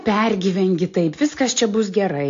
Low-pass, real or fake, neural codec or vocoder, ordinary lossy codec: 7.2 kHz; real; none; AAC, 48 kbps